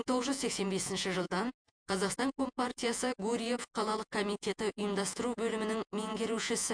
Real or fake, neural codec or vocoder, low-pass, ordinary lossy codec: fake; vocoder, 48 kHz, 128 mel bands, Vocos; 9.9 kHz; MP3, 64 kbps